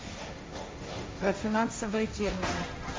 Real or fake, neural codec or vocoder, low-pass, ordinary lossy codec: fake; codec, 16 kHz, 1.1 kbps, Voila-Tokenizer; none; none